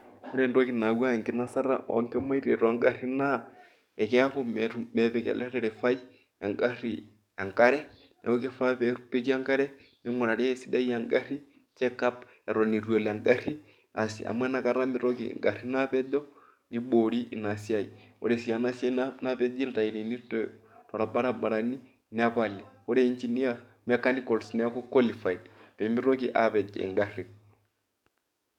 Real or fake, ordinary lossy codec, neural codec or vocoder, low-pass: fake; none; codec, 44.1 kHz, 7.8 kbps, DAC; 19.8 kHz